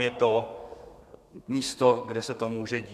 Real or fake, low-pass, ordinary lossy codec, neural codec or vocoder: fake; 14.4 kHz; MP3, 96 kbps; codec, 32 kHz, 1.9 kbps, SNAC